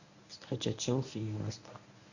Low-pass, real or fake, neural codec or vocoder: 7.2 kHz; fake; codec, 24 kHz, 0.9 kbps, WavTokenizer, medium speech release version 1